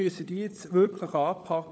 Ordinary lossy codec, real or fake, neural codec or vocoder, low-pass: none; fake; codec, 16 kHz, 16 kbps, FunCodec, trained on LibriTTS, 50 frames a second; none